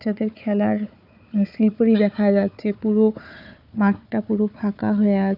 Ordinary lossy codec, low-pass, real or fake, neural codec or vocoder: none; 5.4 kHz; fake; codec, 16 kHz, 4 kbps, FunCodec, trained on Chinese and English, 50 frames a second